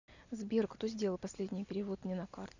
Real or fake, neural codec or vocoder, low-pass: real; none; 7.2 kHz